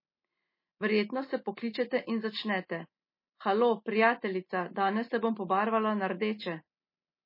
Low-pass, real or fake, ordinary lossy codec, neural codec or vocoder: 5.4 kHz; real; MP3, 24 kbps; none